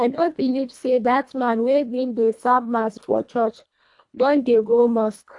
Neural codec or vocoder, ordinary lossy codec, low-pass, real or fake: codec, 24 kHz, 1.5 kbps, HILCodec; AAC, 64 kbps; 10.8 kHz; fake